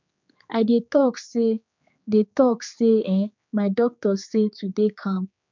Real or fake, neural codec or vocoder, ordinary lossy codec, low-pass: fake; codec, 16 kHz, 4 kbps, X-Codec, HuBERT features, trained on general audio; MP3, 64 kbps; 7.2 kHz